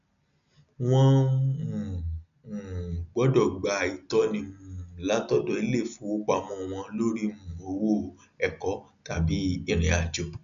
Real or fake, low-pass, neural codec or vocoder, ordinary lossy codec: real; 7.2 kHz; none; none